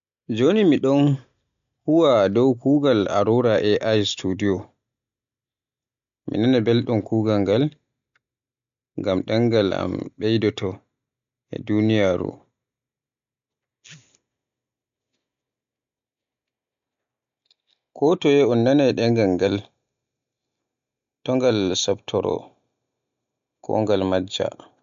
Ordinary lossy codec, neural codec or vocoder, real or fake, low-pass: MP3, 64 kbps; none; real; 7.2 kHz